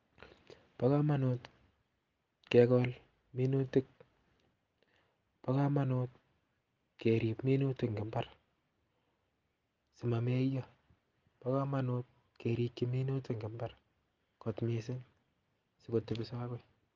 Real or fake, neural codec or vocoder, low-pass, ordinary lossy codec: real; none; 7.2 kHz; Opus, 24 kbps